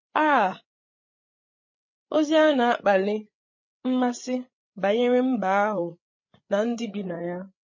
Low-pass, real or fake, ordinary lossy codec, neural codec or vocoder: 7.2 kHz; fake; MP3, 32 kbps; codec, 16 kHz, 8 kbps, FreqCodec, larger model